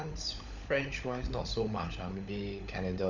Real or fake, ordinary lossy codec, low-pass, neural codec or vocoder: fake; none; 7.2 kHz; codec, 16 kHz, 16 kbps, FunCodec, trained on LibriTTS, 50 frames a second